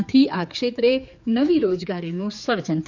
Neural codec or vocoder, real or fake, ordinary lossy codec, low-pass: codec, 16 kHz, 4 kbps, X-Codec, HuBERT features, trained on general audio; fake; none; 7.2 kHz